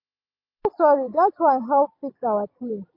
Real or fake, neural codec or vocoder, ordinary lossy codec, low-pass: real; none; MP3, 32 kbps; 5.4 kHz